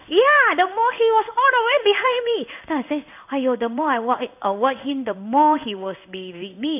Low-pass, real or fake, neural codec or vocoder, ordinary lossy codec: 3.6 kHz; fake; codec, 16 kHz in and 24 kHz out, 1 kbps, XY-Tokenizer; none